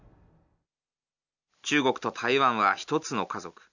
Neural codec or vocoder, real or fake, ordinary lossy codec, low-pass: none; real; none; 7.2 kHz